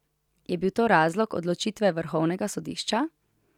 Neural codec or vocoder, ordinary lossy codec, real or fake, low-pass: none; none; real; 19.8 kHz